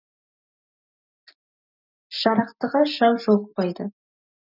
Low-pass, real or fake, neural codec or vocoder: 5.4 kHz; real; none